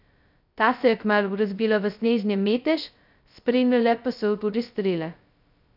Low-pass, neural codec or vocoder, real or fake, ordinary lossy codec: 5.4 kHz; codec, 16 kHz, 0.2 kbps, FocalCodec; fake; none